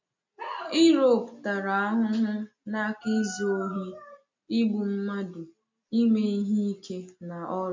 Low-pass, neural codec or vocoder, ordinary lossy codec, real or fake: 7.2 kHz; none; MP3, 48 kbps; real